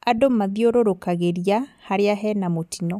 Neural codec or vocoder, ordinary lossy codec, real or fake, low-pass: none; none; real; 14.4 kHz